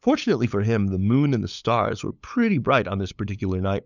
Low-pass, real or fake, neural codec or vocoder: 7.2 kHz; fake; codec, 16 kHz, 8 kbps, FunCodec, trained on LibriTTS, 25 frames a second